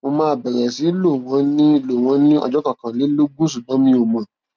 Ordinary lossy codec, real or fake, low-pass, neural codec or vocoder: none; real; none; none